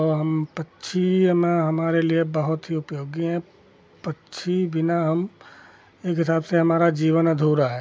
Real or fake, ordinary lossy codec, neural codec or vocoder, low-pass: real; none; none; none